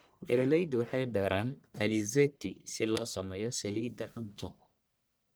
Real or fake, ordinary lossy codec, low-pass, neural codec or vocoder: fake; none; none; codec, 44.1 kHz, 1.7 kbps, Pupu-Codec